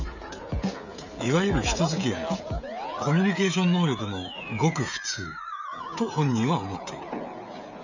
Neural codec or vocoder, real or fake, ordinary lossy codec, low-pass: codec, 16 kHz, 16 kbps, FreqCodec, smaller model; fake; none; 7.2 kHz